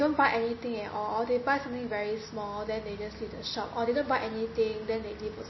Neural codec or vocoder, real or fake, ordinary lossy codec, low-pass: none; real; MP3, 24 kbps; 7.2 kHz